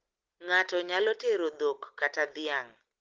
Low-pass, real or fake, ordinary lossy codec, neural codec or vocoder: 7.2 kHz; real; Opus, 16 kbps; none